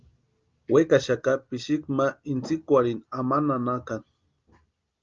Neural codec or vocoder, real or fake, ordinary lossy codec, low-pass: none; real; Opus, 24 kbps; 7.2 kHz